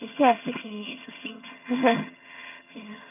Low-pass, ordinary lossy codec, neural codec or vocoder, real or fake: 3.6 kHz; none; vocoder, 22.05 kHz, 80 mel bands, HiFi-GAN; fake